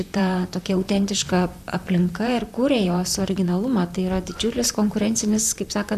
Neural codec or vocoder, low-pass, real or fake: vocoder, 44.1 kHz, 128 mel bands, Pupu-Vocoder; 14.4 kHz; fake